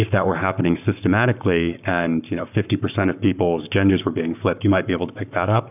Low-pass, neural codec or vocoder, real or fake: 3.6 kHz; codec, 44.1 kHz, 7.8 kbps, Pupu-Codec; fake